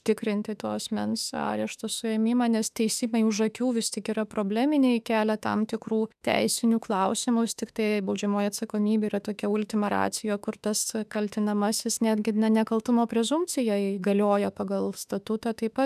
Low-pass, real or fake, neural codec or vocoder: 14.4 kHz; fake; autoencoder, 48 kHz, 32 numbers a frame, DAC-VAE, trained on Japanese speech